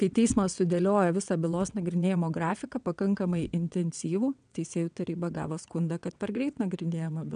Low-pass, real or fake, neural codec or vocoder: 9.9 kHz; fake; vocoder, 22.05 kHz, 80 mel bands, WaveNeXt